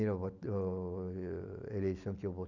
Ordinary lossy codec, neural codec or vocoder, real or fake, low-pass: none; none; real; 7.2 kHz